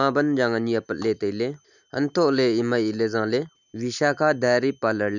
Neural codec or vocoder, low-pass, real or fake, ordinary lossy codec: none; 7.2 kHz; real; none